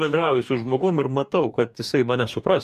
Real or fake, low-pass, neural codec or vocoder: fake; 14.4 kHz; codec, 44.1 kHz, 2.6 kbps, DAC